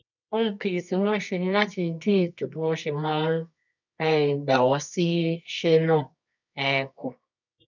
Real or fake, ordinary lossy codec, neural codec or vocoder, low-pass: fake; none; codec, 24 kHz, 0.9 kbps, WavTokenizer, medium music audio release; 7.2 kHz